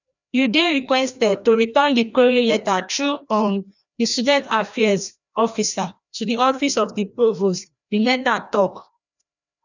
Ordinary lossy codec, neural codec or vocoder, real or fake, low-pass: none; codec, 16 kHz, 1 kbps, FreqCodec, larger model; fake; 7.2 kHz